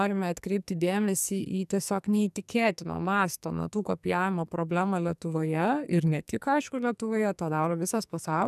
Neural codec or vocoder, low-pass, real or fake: codec, 44.1 kHz, 2.6 kbps, SNAC; 14.4 kHz; fake